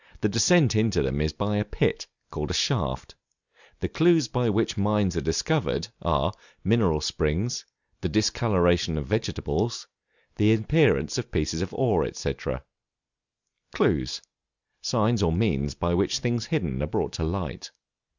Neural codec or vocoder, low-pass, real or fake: none; 7.2 kHz; real